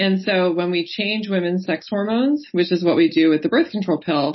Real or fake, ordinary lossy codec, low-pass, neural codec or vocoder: real; MP3, 24 kbps; 7.2 kHz; none